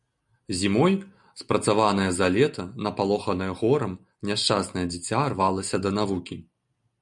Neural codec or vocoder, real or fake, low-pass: none; real; 10.8 kHz